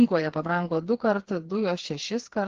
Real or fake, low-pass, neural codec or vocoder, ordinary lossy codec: fake; 7.2 kHz; codec, 16 kHz, 4 kbps, FreqCodec, smaller model; Opus, 16 kbps